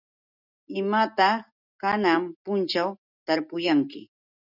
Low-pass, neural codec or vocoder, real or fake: 5.4 kHz; none; real